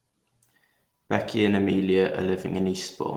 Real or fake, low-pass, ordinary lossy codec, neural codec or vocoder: real; 19.8 kHz; Opus, 16 kbps; none